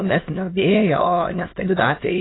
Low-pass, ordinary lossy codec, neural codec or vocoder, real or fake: 7.2 kHz; AAC, 16 kbps; autoencoder, 22.05 kHz, a latent of 192 numbers a frame, VITS, trained on many speakers; fake